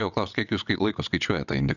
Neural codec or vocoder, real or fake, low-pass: vocoder, 22.05 kHz, 80 mel bands, WaveNeXt; fake; 7.2 kHz